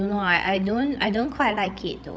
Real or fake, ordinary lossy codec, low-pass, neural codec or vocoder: fake; none; none; codec, 16 kHz, 8 kbps, FreqCodec, larger model